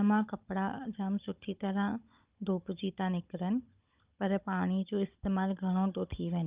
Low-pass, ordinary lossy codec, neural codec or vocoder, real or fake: 3.6 kHz; Opus, 24 kbps; codec, 16 kHz, 16 kbps, FunCodec, trained on Chinese and English, 50 frames a second; fake